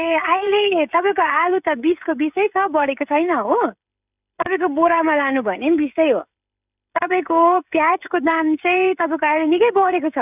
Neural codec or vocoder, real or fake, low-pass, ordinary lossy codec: codec, 16 kHz, 16 kbps, FreqCodec, smaller model; fake; 3.6 kHz; none